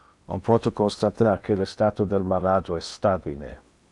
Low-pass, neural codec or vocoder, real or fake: 10.8 kHz; codec, 16 kHz in and 24 kHz out, 0.8 kbps, FocalCodec, streaming, 65536 codes; fake